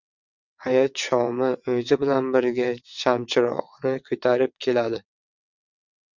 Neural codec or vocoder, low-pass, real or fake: vocoder, 22.05 kHz, 80 mel bands, WaveNeXt; 7.2 kHz; fake